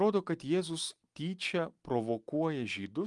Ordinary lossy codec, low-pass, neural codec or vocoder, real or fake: Opus, 32 kbps; 10.8 kHz; none; real